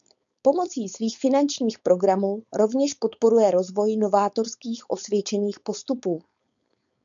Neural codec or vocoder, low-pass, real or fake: codec, 16 kHz, 4.8 kbps, FACodec; 7.2 kHz; fake